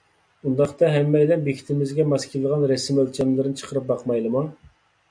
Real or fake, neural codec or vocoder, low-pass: real; none; 9.9 kHz